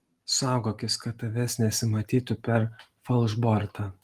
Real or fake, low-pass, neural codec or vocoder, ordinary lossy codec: real; 14.4 kHz; none; Opus, 24 kbps